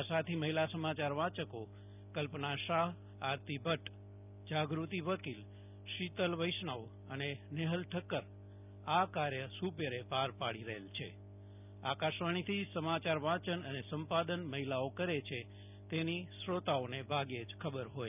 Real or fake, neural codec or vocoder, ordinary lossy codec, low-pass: real; none; none; 3.6 kHz